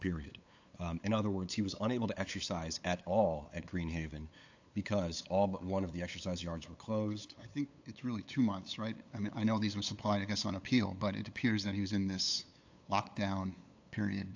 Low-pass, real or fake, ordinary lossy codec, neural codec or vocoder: 7.2 kHz; fake; AAC, 48 kbps; codec, 16 kHz, 8 kbps, FunCodec, trained on LibriTTS, 25 frames a second